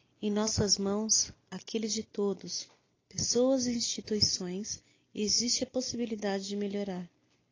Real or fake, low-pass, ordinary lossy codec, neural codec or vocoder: real; 7.2 kHz; AAC, 32 kbps; none